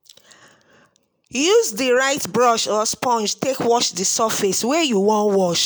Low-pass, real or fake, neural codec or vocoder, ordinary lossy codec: none; real; none; none